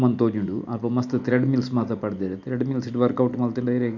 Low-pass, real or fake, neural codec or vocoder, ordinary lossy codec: 7.2 kHz; real; none; none